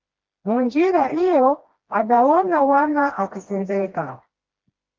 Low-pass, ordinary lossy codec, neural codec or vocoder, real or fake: 7.2 kHz; Opus, 32 kbps; codec, 16 kHz, 1 kbps, FreqCodec, smaller model; fake